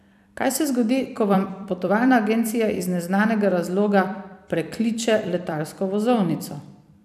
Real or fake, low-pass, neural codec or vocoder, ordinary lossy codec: fake; 14.4 kHz; vocoder, 44.1 kHz, 128 mel bands every 256 samples, BigVGAN v2; none